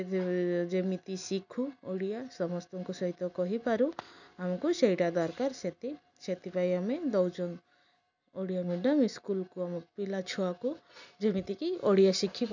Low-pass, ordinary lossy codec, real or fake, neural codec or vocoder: 7.2 kHz; none; real; none